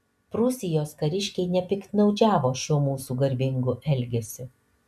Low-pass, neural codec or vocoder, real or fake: 14.4 kHz; none; real